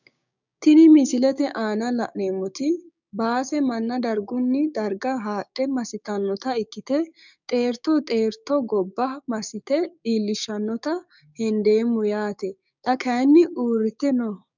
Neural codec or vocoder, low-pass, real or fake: codec, 16 kHz, 6 kbps, DAC; 7.2 kHz; fake